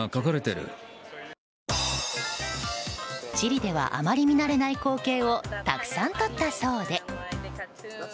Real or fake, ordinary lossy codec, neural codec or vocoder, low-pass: real; none; none; none